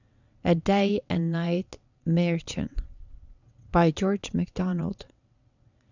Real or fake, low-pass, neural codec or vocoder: fake; 7.2 kHz; vocoder, 22.05 kHz, 80 mel bands, WaveNeXt